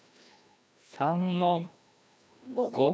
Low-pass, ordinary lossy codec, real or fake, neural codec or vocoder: none; none; fake; codec, 16 kHz, 1 kbps, FreqCodec, larger model